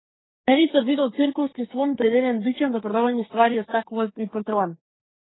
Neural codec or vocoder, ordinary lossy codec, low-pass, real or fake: codec, 44.1 kHz, 2.6 kbps, SNAC; AAC, 16 kbps; 7.2 kHz; fake